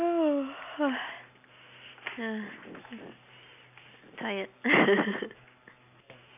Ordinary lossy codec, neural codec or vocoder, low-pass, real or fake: none; none; 3.6 kHz; real